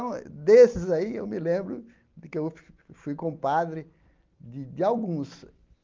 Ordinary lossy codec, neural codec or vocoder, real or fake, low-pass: Opus, 24 kbps; none; real; 7.2 kHz